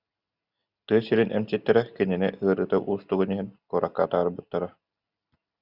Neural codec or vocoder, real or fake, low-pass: none; real; 5.4 kHz